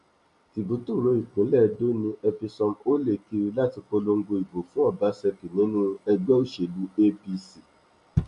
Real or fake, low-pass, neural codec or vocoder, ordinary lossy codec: real; 10.8 kHz; none; none